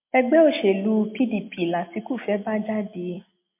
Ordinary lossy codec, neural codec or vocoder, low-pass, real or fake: MP3, 24 kbps; none; 3.6 kHz; real